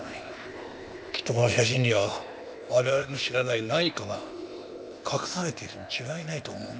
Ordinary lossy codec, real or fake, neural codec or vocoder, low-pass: none; fake; codec, 16 kHz, 0.8 kbps, ZipCodec; none